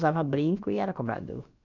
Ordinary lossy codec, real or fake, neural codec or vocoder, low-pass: none; fake; codec, 16 kHz, about 1 kbps, DyCAST, with the encoder's durations; 7.2 kHz